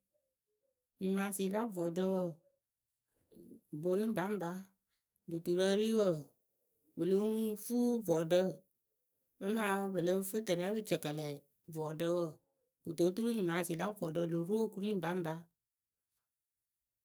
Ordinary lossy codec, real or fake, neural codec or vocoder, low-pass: none; fake; codec, 44.1 kHz, 2.6 kbps, SNAC; none